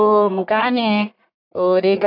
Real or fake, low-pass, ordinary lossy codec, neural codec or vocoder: fake; 5.4 kHz; none; codec, 44.1 kHz, 1.7 kbps, Pupu-Codec